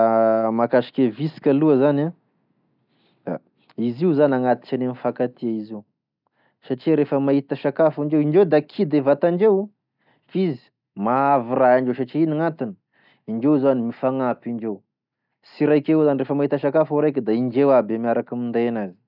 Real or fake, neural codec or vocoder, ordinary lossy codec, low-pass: real; none; none; 5.4 kHz